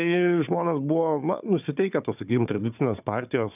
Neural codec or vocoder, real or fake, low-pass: codec, 16 kHz, 4 kbps, FunCodec, trained on Chinese and English, 50 frames a second; fake; 3.6 kHz